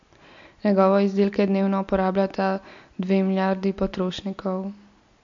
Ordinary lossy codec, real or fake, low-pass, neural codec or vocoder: MP3, 48 kbps; real; 7.2 kHz; none